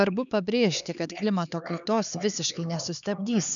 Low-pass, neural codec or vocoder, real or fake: 7.2 kHz; codec, 16 kHz, 4 kbps, X-Codec, HuBERT features, trained on LibriSpeech; fake